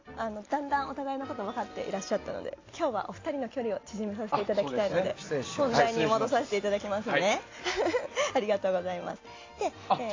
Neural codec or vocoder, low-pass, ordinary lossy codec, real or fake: none; 7.2 kHz; AAC, 32 kbps; real